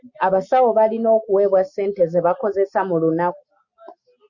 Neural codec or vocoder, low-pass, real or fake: none; 7.2 kHz; real